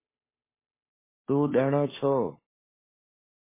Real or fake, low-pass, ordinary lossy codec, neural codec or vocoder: fake; 3.6 kHz; MP3, 16 kbps; codec, 16 kHz, 2 kbps, FunCodec, trained on Chinese and English, 25 frames a second